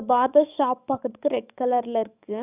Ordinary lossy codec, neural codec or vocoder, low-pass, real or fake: none; codec, 44.1 kHz, 7.8 kbps, DAC; 3.6 kHz; fake